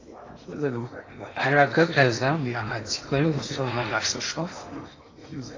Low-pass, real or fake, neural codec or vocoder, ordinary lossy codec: 7.2 kHz; fake; codec, 16 kHz in and 24 kHz out, 0.8 kbps, FocalCodec, streaming, 65536 codes; AAC, 32 kbps